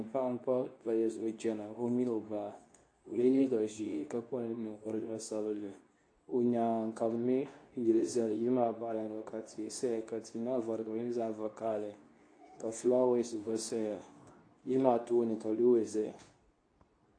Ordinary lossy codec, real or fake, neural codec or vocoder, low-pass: AAC, 48 kbps; fake; codec, 24 kHz, 0.9 kbps, WavTokenizer, medium speech release version 2; 9.9 kHz